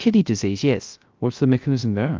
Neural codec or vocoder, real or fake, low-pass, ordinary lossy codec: codec, 16 kHz, 0.3 kbps, FocalCodec; fake; 7.2 kHz; Opus, 24 kbps